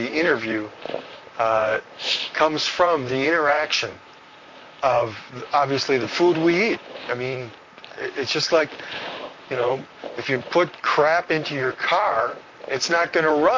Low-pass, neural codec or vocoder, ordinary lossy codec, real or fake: 7.2 kHz; vocoder, 44.1 kHz, 128 mel bands, Pupu-Vocoder; MP3, 48 kbps; fake